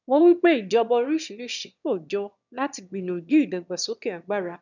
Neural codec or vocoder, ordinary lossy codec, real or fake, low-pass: autoencoder, 22.05 kHz, a latent of 192 numbers a frame, VITS, trained on one speaker; none; fake; 7.2 kHz